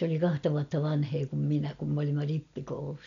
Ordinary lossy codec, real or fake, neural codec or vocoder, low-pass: none; real; none; 7.2 kHz